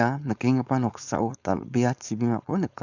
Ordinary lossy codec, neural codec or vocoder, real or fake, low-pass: none; codec, 16 kHz, 4.8 kbps, FACodec; fake; 7.2 kHz